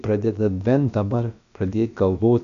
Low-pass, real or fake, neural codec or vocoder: 7.2 kHz; fake; codec, 16 kHz, about 1 kbps, DyCAST, with the encoder's durations